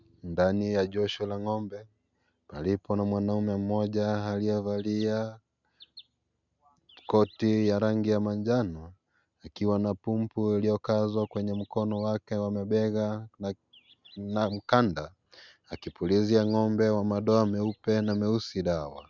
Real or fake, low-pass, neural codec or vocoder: real; 7.2 kHz; none